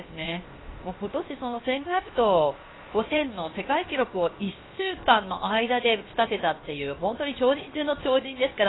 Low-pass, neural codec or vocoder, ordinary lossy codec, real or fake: 7.2 kHz; codec, 16 kHz, 0.7 kbps, FocalCodec; AAC, 16 kbps; fake